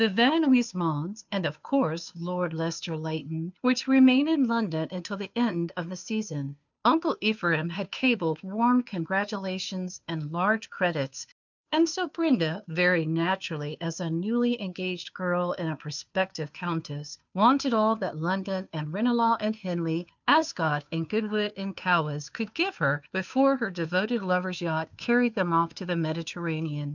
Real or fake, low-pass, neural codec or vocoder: fake; 7.2 kHz; codec, 16 kHz, 2 kbps, FunCodec, trained on Chinese and English, 25 frames a second